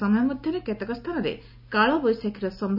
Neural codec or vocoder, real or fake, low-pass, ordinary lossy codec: none; real; 5.4 kHz; none